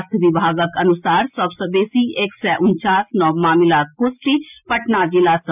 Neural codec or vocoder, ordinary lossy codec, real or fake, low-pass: none; none; real; 3.6 kHz